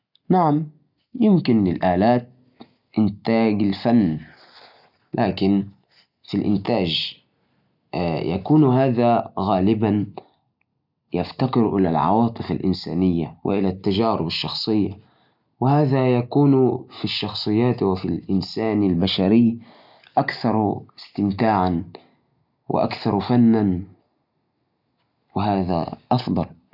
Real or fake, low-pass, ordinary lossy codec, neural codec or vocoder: real; 5.4 kHz; none; none